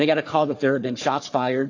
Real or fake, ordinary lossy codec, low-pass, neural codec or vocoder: fake; AAC, 48 kbps; 7.2 kHz; codec, 44.1 kHz, 3.4 kbps, Pupu-Codec